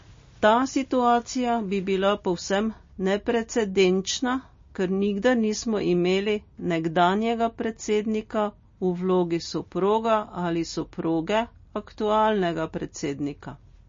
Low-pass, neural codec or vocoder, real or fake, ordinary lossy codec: 7.2 kHz; none; real; MP3, 32 kbps